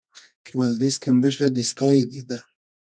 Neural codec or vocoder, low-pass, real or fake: codec, 24 kHz, 0.9 kbps, WavTokenizer, medium music audio release; 9.9 kHz; fake